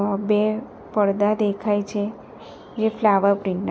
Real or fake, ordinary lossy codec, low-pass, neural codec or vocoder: real; none; none; none